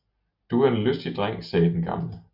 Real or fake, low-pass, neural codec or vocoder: real; 5.4 kHz; none